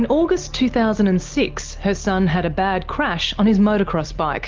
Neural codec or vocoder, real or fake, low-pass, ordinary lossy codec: none; real; 7.2 kHz; Opus, 32 kbps